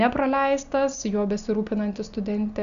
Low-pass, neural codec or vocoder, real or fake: 7.2 kHz; none; real